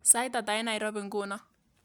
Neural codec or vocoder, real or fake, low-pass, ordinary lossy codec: none; real; none; none